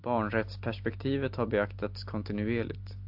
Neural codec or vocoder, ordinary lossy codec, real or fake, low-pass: none; AAC, 48 kbps; real; 5.4 kHz